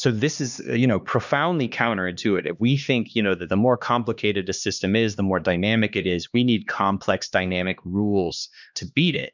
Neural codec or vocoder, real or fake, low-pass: codec, 16 kHz, 2 kbps, X-Codec, HuBERT features, trained on LibriSpeech; fake; 7.2 kHz